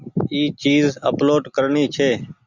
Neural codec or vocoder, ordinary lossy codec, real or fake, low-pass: none; Opus, 64 kbps; real; 7.2 kHz